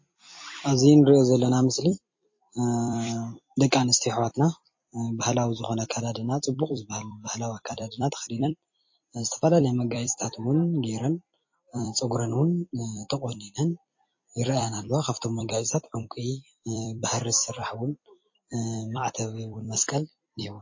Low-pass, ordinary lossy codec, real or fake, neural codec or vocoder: 7.2 kHz; MP3, 32 kbps; fake; vocoder, 44.1 kHz, 128 mel bands every 256 samples, BigVGAN v2